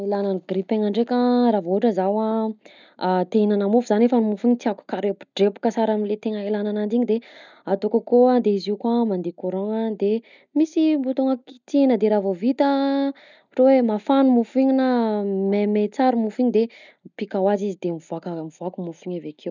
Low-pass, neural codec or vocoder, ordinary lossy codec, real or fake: 7.2 kHz; none; none; real